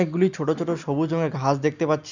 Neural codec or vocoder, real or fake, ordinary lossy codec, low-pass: none; real; none; 7.2 kHz